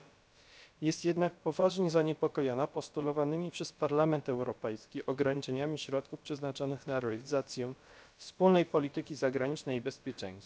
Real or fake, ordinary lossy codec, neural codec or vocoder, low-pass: fake; none; codec, 16 kHz, about 1 kbps, DyCAST, with the encoder's durations; none